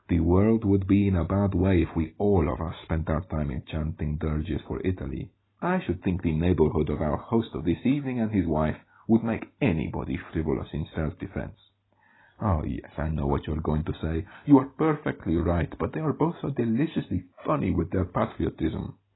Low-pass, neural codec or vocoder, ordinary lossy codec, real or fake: 7.2 kHz; none; AAC, 16 kbps; real